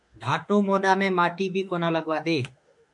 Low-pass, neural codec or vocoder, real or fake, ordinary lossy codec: 10.8 kHz; autoencoder, 48 kHz, 32 numbers a frame, DAC-VAE, trained on Japanese speech; fake; MP3, 64 kbps